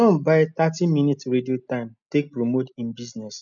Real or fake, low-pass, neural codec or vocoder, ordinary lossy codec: real; 7.2 kHz; none; MP3, 96 kbps